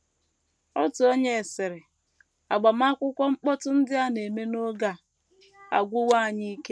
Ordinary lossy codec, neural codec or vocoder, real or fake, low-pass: none; none; real; none